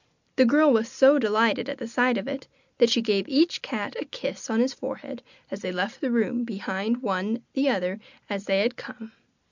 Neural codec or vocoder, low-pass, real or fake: none; 7.2 kHz; real